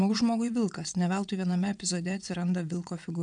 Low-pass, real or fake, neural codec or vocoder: 9.9 kHz; fake; vocoder, 22.05 kHz, 80 mel bands, WaveNeXt